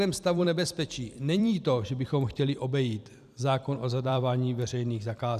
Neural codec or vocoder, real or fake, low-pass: vocoder, 44.1 kHz, 128 mel bands every 256 samples, BigVGAN v2; fake; 14.4 kHz